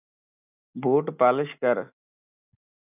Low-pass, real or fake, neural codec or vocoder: 3.6 kHz; real; none